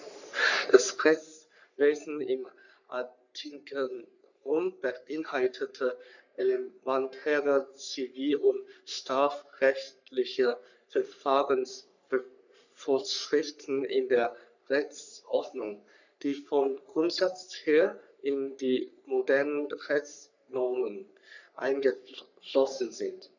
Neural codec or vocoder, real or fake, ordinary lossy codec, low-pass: codec, 44.1 kHz, 3.4 kbps, Pupu-Codec; fake; none; 7.2 kHz